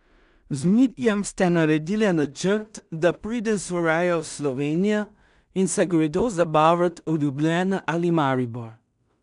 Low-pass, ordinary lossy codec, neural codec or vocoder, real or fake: 10.8 kHz; none; codec, 16 kHz in and 24 kHz out, 0.4 kbps, LongCat-Audio-Codec, two codebook decoder; fake